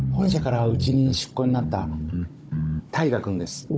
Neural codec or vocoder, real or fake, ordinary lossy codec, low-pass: codec, 16 kHz, 16 kbps, FunCodec, trained on Chinese and English, 50 frames a second; fake; none; none